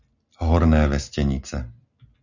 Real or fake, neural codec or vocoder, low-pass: real; none; 7.2 kHz